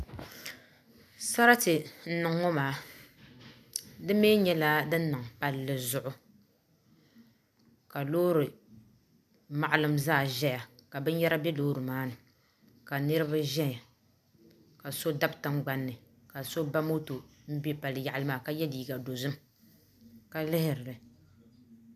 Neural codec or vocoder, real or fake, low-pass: none; real; 14.4 kHz